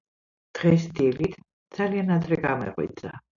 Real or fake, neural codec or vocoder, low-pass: real; none; 7.2 kHz